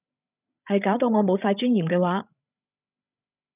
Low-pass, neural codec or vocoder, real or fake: 3.6 kHz; codec, 16 kHz, 16 kbps, FreqCodec, larger model; fake